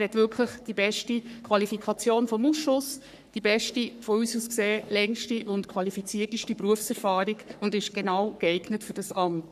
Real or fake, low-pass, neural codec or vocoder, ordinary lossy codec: fake; 14.4 kHz; codec, 44.1 kHz, 3.4 kbps, Pupu-Codec; none